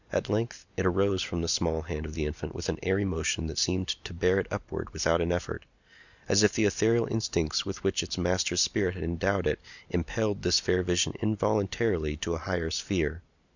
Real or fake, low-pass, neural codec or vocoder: real; 7.2 kHz; none